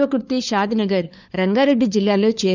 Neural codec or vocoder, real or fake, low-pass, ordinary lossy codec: codec, 16 kHz, 4 kbps, FunCodec, trained on LibriTTS, 50 frames a second; fake; 7.2 kHz; none